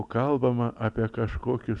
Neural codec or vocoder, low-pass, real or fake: none; 10.8 kHz; real